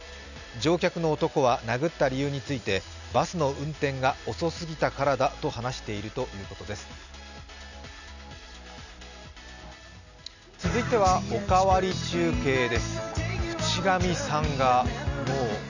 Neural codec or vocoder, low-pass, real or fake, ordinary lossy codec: none; 7.2 kHz; real; none